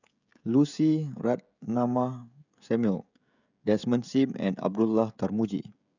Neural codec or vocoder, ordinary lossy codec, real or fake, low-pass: codec, 16 kHz, 16 kbps, FreqCodec, smaller model; none; fake; 7.2 kHz